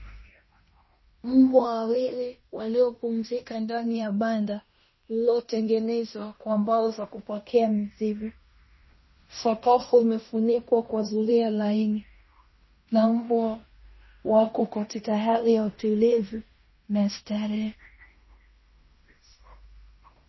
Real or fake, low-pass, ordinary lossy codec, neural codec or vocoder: fake; 7.2 kHz; MP3, 24 kbps; codec, 16 kHz in and 24 kHz out, 0.9 kbps, LongCat-Audio-Codec, fine tuned four codebook decoder